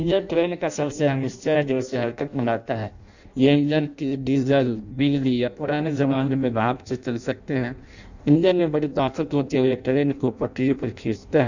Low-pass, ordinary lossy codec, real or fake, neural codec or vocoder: 7.2 kHz; none; fake; codec, 16 kHz in and 24 kHz out, 0.6 kbps, FireRedTTS-2 codec